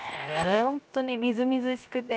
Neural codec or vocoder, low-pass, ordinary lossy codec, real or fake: codec, 16 kHz, 0.7 kbps, FocalCodec; none; none; fake